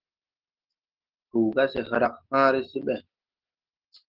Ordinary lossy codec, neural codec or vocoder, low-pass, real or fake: Opus, 16 kbps; none; 5.4 kHz; real